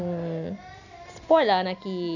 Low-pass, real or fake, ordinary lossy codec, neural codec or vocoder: 7.2 kHz; real; none; none